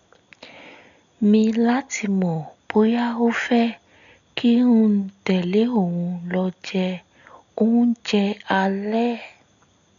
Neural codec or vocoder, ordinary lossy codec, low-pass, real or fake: none; none; 7.2 kHz; real